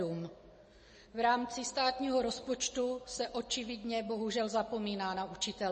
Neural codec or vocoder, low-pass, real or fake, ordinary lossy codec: none; 10.8 kHz; real; MP3, 32 kbps